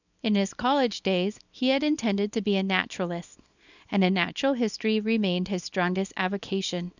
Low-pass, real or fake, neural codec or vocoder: 7.2 kHz; fake; codec, 24 kHz, 0.9 kbps, WavTokenizer, small release